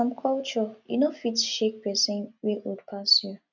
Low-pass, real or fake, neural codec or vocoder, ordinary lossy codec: 7.2 kHz; real; none; none